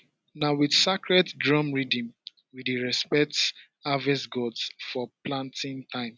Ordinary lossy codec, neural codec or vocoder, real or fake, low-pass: none; none; real; none